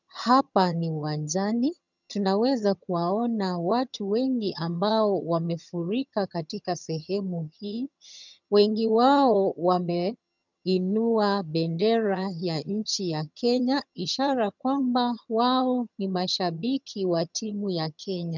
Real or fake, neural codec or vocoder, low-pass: fake; vocoder, 22.05 kHz, 80 mel bands, HiFi-GAN; 7.2 kHz